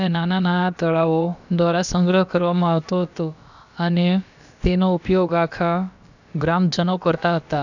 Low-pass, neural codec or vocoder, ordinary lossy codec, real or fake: 7.2 kHz; codec, 16 kHz, about 1 kbps, DyCAST, with the encoder's durations; none; fake